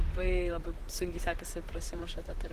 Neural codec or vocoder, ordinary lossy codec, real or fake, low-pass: vocoder, 44.1 kHz, 128 mel bands, Pupu-Vocoder; Opus, 16 kbps; fake; 14.4 kHz